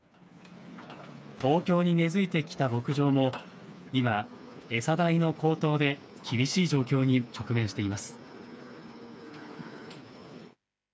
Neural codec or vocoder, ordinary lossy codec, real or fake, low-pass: codec, 16 kHz, 4 kbps, FreqCodec, smaller model; none; fake; none